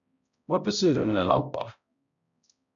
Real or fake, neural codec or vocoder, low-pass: fake; codec, 16 kHz, 0.5 kbps, X-Codec, HuBERT features, trained on balanced general audio; 7.2 kHz